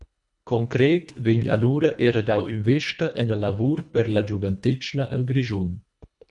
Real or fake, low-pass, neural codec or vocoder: fake; 10.8 kHz; codec, 24 kHz, 1.5 kbps, HILCodec